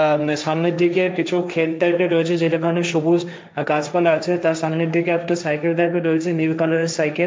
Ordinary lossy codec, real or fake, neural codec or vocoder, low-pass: none; fake; codec, 16 kHz, 1.1 kbps, Voila-Tokenizer; none